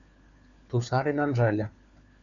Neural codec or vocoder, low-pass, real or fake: codec, 16 kHz, 4 kbps, FunCodec, trained on LibriTTS, 50 frames a second; 7.2 kHz; fake